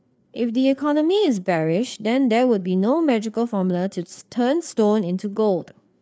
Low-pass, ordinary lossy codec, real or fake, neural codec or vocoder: none; none; fake; codec, 16 kHz, 4 kbps, FreqCodec, larger model